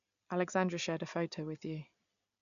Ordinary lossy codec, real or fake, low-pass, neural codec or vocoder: none; real; 7.2 kHz; none